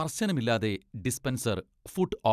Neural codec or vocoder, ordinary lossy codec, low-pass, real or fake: none; none; 14.4 kHz; real